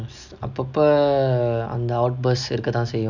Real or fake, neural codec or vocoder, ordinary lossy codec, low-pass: real; none; none; 7.2 kHz